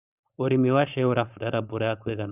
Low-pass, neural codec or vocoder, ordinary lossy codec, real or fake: 3.6 kHz; codec, 16 kHz, 4.8 kbps, FACodec; none; fake